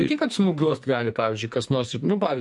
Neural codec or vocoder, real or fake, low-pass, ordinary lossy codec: codec, 44.1 kHz, 2.6 kbps, SNAC; fake; 10.8 kHz; MP3, 64 kbps